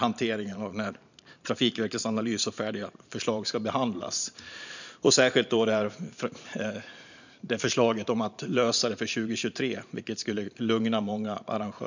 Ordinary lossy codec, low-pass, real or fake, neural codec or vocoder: none; 7.2 kHz; fake; vocoder, 44.1 kHz, 128 mel bands every 512 samples, BigVGAN v2